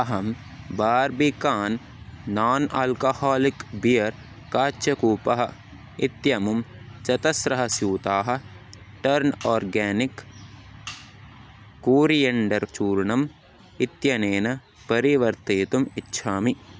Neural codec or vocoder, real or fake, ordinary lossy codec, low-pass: none; real; none; none